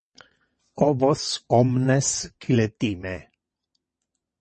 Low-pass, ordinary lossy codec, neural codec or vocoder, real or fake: 9.9 kHz; MP3, 32 kbps; vocoder, 22.05 kHz, 80 mel bands, WaveNeXt; fake